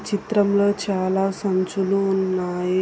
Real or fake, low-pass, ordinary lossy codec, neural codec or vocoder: real; none; none; none